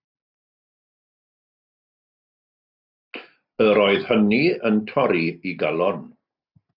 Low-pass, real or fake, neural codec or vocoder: 5.4 kHz; real; none